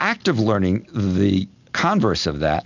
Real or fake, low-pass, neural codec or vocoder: real; 7.2 kHz; none